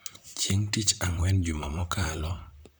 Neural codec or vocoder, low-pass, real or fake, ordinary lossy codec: vocoder, 44.1 kHz, 128 mel bands, Pupu-Vocoder; none; fake; none